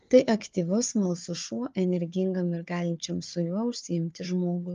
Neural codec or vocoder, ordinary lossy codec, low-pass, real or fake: codec, 16 kHz, 4 kbps, FunCodec, trained on Chinese and English, 50 frames a second; Opus, 32 kbps; 7.2 kHz; fake